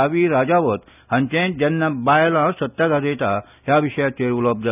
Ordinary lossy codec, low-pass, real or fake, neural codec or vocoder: none; 3.6 kHz; real; none